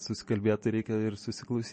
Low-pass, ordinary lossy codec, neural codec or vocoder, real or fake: 10.8 kHz; MP3, 32 kbps; none; real